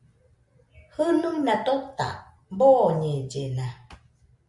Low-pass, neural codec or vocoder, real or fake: 10.8 kHz; none; real